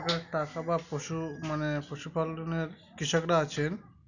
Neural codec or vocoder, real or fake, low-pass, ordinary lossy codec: none; real; 7.2 kHz; none